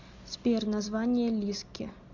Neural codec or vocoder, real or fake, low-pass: none; real; 7.2 kHz